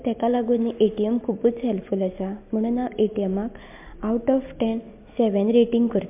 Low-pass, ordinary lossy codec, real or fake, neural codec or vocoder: 3.6 kHz; MP3, 32 kbps; real; none